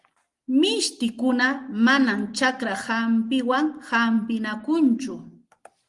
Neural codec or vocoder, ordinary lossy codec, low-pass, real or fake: none; Opus, 24 kbps; 10.8 kHz; real